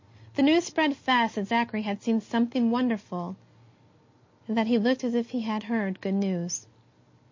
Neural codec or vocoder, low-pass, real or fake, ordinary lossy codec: none; 7.2 kHz; real; MP3, 32 kbps